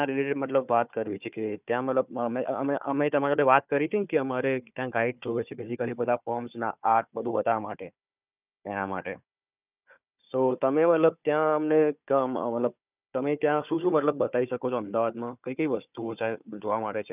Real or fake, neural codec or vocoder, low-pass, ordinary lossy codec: fake; codec, 16 kHz, 4 kbps, FunCodec, trained on Chinese and English, 50 frames a second; 3.6 kHz; none